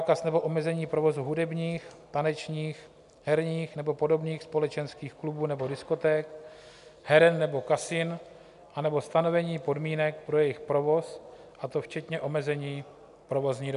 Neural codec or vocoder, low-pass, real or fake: none; 10.8 kHz; real